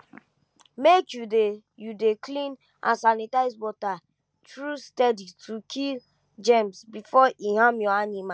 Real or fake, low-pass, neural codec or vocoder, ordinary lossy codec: real; none; none; none